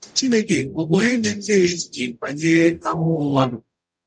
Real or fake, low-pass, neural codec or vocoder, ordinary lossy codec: fake; 9.9 kHz; codec, 44.1 kHz, 0.9 kbps, DAC; none